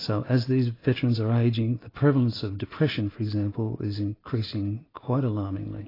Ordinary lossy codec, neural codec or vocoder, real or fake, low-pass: AAC, 24 kbps; none; real; 5.4 kHz